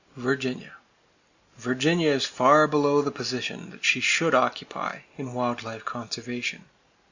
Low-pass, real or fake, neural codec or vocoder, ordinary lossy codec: 7.2 kHz; real; none; Opus, 64 kbps